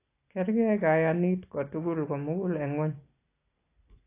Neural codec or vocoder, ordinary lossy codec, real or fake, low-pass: none; AAC, 24 kbps; real; 3.6 kHz